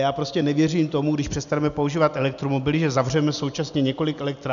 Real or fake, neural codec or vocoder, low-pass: real; none; 7.2 kHz